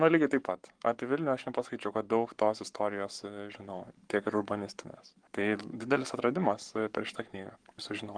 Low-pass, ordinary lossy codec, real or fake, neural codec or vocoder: 9.9 kHz; Opus, 24 kbps; fake; codec, 44.1 kHz, 7.8 kbps, Pupu-Codec